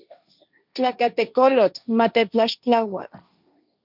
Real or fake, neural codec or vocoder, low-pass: fake; codec, 16 kHz, 1.1 kbps, Voila-Tokenizer; 5.4 kHz